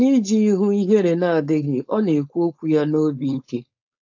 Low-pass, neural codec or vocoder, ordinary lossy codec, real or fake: 7.2 kHz; codec, 16 kHz, 4.8 kbps, FACodec; AAC, 48 kbps; fake